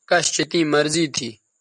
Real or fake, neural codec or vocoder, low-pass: real; none; 10.8 kHz